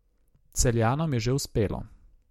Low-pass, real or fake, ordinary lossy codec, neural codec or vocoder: 19.8 kHz; real; MP3, 64 kbps; none